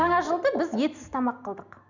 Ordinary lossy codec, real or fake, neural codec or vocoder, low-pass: none; real; none; 7.2 kHz